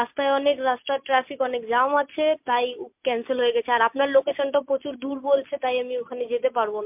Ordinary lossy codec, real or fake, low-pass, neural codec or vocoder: MP3, 32 kbps; real; 3.6 kHz; none